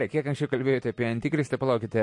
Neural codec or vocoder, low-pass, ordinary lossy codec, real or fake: none; 10.8 kHz; MP3, 48 kbps; real